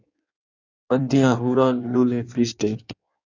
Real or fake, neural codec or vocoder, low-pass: fake; codec, 16 kHz in and 24 kHz out, 1.1 kbps, FireRedTTS-2 codec; 7.2 kHz